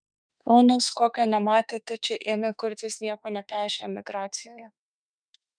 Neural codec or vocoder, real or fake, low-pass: autoencoder, 48 kHz, 32 numbers a frame, DAC-VAE, trained on Japanese speech; fake; 9.9 kHz